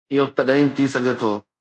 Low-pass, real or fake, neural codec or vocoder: 10.8 kHz; fake; codec, 24 kHz, 0.5 kbps, DualCodec